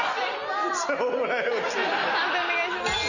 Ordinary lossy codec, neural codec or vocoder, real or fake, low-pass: none; none; real; 7.2 kHz